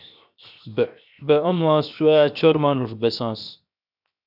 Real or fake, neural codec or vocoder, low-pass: fake; codec, 16 kHz, 0.7 kbps, FocalCodec; 5.4 kHz